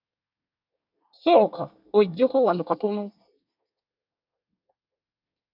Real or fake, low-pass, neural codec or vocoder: fake; 5.4 kHz; codec, 24 kHz, 1 kbps, SNAC